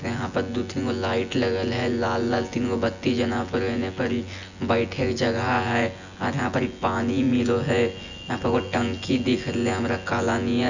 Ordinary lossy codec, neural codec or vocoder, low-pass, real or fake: none; vocoder, 24 kHz, 100 mel bands, Vocos; 7.2 kHz; fake